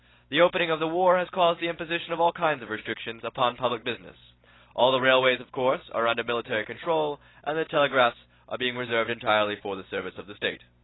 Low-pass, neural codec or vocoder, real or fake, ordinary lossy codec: 7.2 kHz; none; real; AAC, 16 kbps